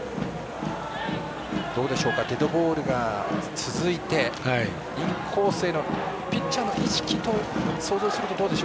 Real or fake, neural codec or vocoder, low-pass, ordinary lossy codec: real; none; none; none